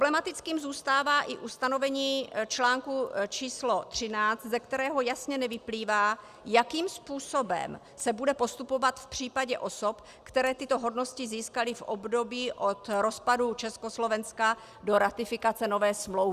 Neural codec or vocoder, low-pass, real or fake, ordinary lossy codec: none; 14.4 kHz; real; Opus, 64 kbps